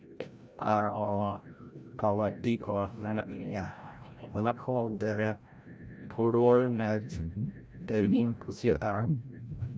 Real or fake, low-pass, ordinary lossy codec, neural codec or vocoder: fake; none; none; codec, 16 kHz, 0.5 kbps, FreqCodec, larger model